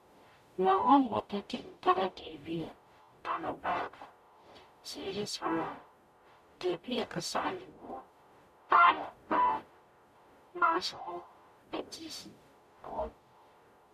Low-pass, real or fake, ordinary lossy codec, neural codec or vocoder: 14.4 kHz; fake; MP3, 96 kbps; codec, 44.1 kHz, 0.9 kbps, DAC